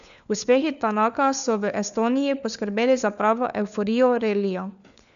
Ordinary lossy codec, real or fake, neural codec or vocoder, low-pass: none; fake; codec, 16 kHz, 4 kbps, FunCodec, trained on LibriTTS, 50 frames a second; 7.2 kHz